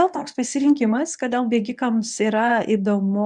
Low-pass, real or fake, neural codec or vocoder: 10.8 kHz; fake; codec, 24 kHz, 0.9 kbps, WavTokenizer, medium speech release version 1